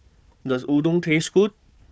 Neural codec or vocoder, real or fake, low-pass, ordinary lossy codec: codec, 16 kHz, 4 kbps, FunCodec, trained on Chinese and English, 50 frames a second; fake; none; none